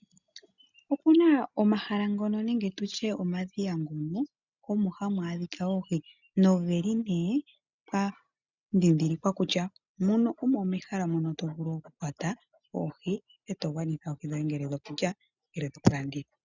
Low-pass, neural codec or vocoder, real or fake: 7.2 kHz; none; real